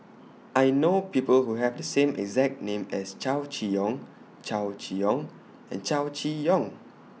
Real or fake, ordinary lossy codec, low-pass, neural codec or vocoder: real; none; none; none